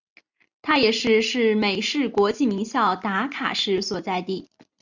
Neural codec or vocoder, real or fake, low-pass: none; real; 7.2 kHz